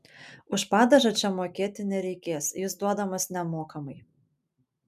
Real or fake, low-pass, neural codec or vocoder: real; 14.4 kHz; none